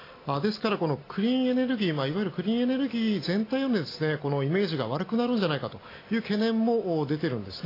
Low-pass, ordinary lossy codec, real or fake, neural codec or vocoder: 5.4 kHz; AAC, 24 kbps; real; none